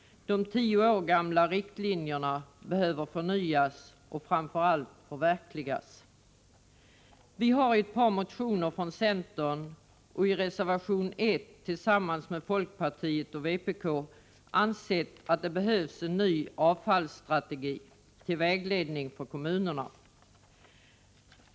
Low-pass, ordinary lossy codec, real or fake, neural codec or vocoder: none; none; real; none